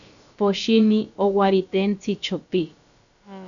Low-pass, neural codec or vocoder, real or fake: 7.2 kHz; codec, 16 kHz, about 1 kbps, DyCAST, with the encoder's durations; fake